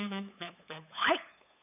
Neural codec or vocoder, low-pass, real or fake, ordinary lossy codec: codec, 16 kHz, 8 kbps, FunCodec, trained on LibriTTS, 25 frames a second; 3.6 kHz; fake; none